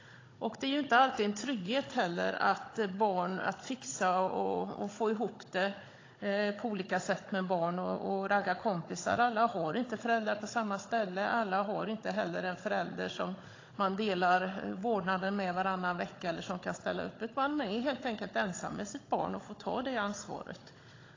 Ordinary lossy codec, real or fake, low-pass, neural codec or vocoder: AAC, 32 kbps; fake; 7.2 kHz; codec, 16 kHz, 16 kbps, FunCodec, trained on Chinese and English, 50 frames a second